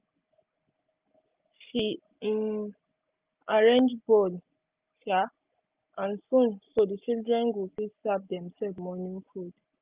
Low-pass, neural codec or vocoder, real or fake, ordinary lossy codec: 3.6 kHz; none; real; Opus, 32 kbps